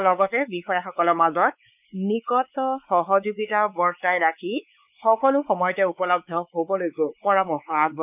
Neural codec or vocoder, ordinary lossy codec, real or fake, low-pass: codec, 16 kHz, 4 kbps, X-Codec, WavLM features, trained on Multilingual LibriSpeech; none; fake; 3.6 kHz